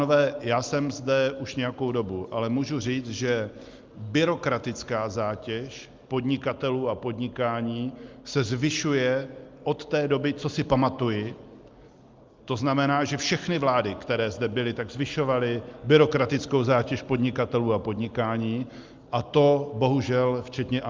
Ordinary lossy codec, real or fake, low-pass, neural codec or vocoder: Opus, 24 kbps; real; 7.2 kHz; none